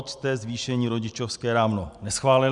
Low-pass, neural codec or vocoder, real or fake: 10.8 kHz; none; real